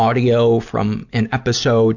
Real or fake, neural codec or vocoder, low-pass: real; none; 7.2 kHz